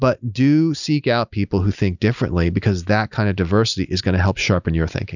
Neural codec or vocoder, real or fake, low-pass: none; real; 7.2 kHz